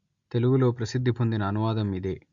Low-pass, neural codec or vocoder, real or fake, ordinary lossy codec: 7.2 kHz; none; real; Opus, 64 kbps